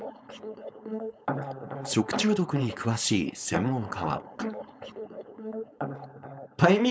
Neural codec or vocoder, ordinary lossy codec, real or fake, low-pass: codec, 16 kHz, 4.8 kbps, FACodec; none; fake; none